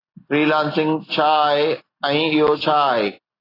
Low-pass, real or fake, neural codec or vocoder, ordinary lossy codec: 5.4 kHz; real; none; AAC, 24 kbps